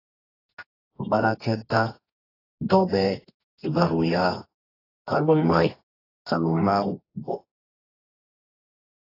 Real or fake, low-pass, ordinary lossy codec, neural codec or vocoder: fake; 5.4 kHz; AAC, 24 kbps; codec, 24 kHz, 0.9 kbps, WavTokenizer, medium music audio release